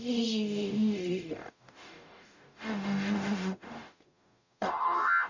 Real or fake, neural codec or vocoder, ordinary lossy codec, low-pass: fake; codec, 44.1 kHz, 0.9 kbps, DAC; none; 7.2 kHz